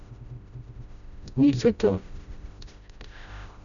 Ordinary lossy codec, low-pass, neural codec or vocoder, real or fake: none; 7.2 kHz; codec, 16 kHz, 0.5 kbps, FreqCodec, smaller model; fake